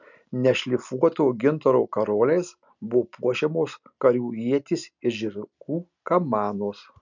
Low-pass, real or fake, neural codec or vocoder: 7.2 kHz; real; none